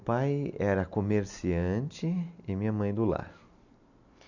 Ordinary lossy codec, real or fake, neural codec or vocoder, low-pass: none; real; none; 7.2 kHz